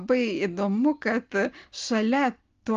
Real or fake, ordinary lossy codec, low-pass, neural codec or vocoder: real; Opus, 32 kbps; 7.2 kHz; none